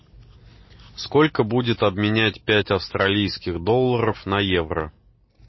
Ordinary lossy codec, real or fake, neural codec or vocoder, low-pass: MP3, 24 kbps; real; none; 7.2 kHz